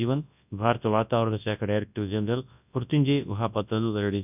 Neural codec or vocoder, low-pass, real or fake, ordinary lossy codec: codec, 24 kHz, 0.9 kbps, WavTokenizer, large speech release; 3.6 kHz; fake; none